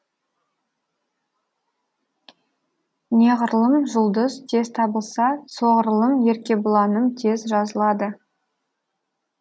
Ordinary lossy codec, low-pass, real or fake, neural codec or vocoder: none; none; real; none